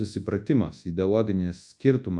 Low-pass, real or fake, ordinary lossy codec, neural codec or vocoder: 10.8 kHz; fake; AAC, 64 kbps; codec, 24 kHz, 0.9 kbps, WavTokenizer, large speech release